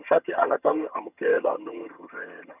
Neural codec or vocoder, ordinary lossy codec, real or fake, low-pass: vocoder, 22.05 kHz, 80 mel bands, HiFi-GAN; none; fake; 3.6 kHz